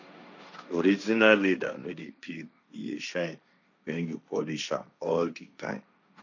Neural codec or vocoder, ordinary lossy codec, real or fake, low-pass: codec, 16 kHz, 1.1 kbps, Voila-Tokenizer; none; fake; 7.2 kHz